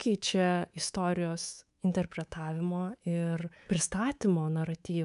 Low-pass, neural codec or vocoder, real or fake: 10.8 kHz; codec, 24 kHz, 3.1 kbps, DualCodec; fake